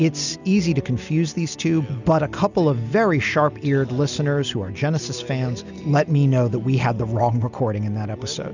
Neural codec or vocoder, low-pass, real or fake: none; 7.2 kHz; real